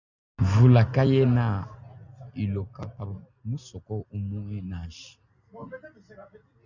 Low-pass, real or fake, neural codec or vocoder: 7.2 kHz; real; none